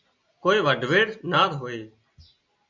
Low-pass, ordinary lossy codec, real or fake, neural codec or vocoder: 7.2 kHz; Opus, 64 kbps; real; none